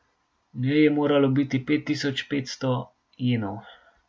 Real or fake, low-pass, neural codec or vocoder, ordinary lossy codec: real; none; none; none